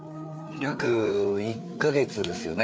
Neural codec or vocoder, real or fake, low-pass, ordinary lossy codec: codec, 16 kHz, 4 kbps, FreqCodec, larger model; fake; none; none